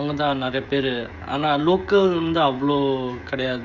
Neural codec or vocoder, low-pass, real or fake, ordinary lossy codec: codec, 16 kHz, 16 kbps, FreqCodec, smaller model; 7.2 kHz; fake; none